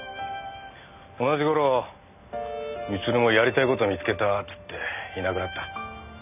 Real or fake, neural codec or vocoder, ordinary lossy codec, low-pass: real; none; none; 3.6 kHz